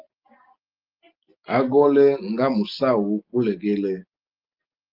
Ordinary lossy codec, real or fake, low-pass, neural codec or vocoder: Opus, 32 kbps; real; 5.4 kHz; none